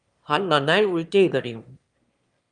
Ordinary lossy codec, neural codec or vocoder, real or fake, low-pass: Opus, 32 kbps; autoencoder, 22.05 kHz, a latent of 192 numbers a frame, VITS, trained on one speaker; fake; 9.9 kHz